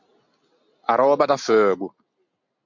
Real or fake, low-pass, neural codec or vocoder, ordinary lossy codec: real; 7.2 kHz; none; MP3, 64 kbps